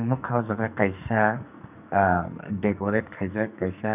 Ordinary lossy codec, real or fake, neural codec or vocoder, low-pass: none; fake; codec, 44.1 kHz, 2.6 kbps, SNAC; 3.6 kHz